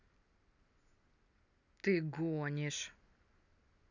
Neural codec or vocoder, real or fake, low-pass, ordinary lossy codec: none; real; 7.2 kHz; none